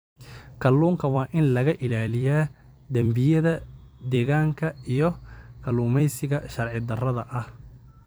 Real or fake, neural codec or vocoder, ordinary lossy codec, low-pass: fake; vocoder, 44.1 kHz, 128 mel bands every 256 samples, BigVGAN v2; none; none